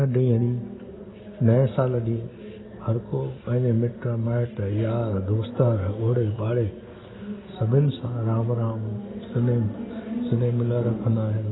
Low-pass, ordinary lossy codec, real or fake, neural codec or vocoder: 7.2 kHz; AAC, 16 kbps; real; none